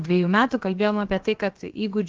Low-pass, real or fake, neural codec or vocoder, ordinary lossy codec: 7.2 kHz; fake; codec, 16 kHz, about 1 kbps, DyCAST, with the encoder's durations; Opus, 16 kbps